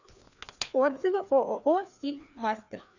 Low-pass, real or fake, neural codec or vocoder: 7.2 kHz; fake; codec, 16 kHz, 2 kbps, FreqCodec, larger model